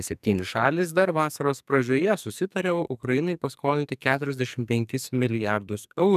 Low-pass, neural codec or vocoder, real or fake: 14.4 kHz; codec, 44.1 kHz, 2.6 kbps, SNAC; fake